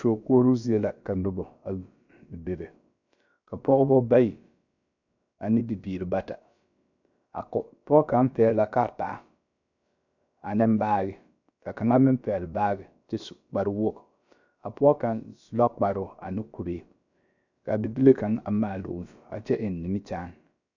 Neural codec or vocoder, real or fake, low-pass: codec, 16 kHz, about 1 kbps, DyCAST, with the encoder's durations; fake; 7.2 kHz